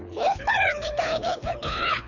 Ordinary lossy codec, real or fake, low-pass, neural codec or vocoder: none; fake; 7.2 kHz; codec, 24 kHz, 3 kbps, HILCodec